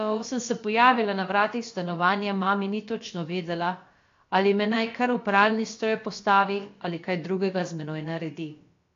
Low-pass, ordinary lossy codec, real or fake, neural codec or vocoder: 7.2 kHz; AAC, 48 kbps; fake; codec, 16 kHz, about 1 kbps, DyCAST, with the encoder's durations